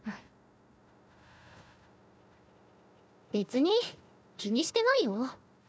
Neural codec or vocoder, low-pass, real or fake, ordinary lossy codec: codec, 16 kHz, 1 kbps, FunCodec, trained on Chinese and English, 50 frames a second; none; fake; none